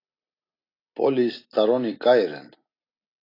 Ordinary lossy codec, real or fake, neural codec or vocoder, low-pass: AAC, 32 kbps; real; none; 5.4 kHz